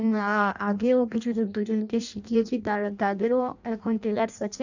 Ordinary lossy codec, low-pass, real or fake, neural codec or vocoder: none; 7.2 kHz; fake; codec, 16 kHz in and 24 kHz out, 0.6 kbps, FireRedTTS-2 codec